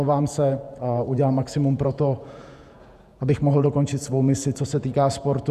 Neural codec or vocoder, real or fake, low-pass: vocoder, 48 kHz, 128 mel bands, Vocos; fake; 14.4 kHz